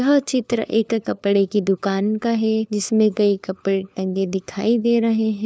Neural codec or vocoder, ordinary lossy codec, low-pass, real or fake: codec, 16 kHz, 4 kbps, FunCodec, trained on LibriTTS, 50 frames a second; none; none; fake